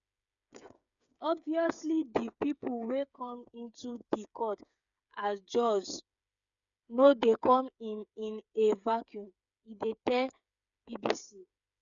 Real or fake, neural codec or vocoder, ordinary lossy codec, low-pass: fake; codec, 16 kHz, 8 kbps, FreqCodec, smaller model; none; 7.2 kHz